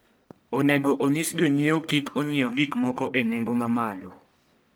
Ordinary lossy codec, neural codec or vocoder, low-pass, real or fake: none; codec, 44.1 kHz, 1.7 kbps, Pupu-Codec; none; fake